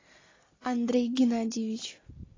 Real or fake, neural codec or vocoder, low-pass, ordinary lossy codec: real; none; 7.2 kHz; AAC, 32 kbps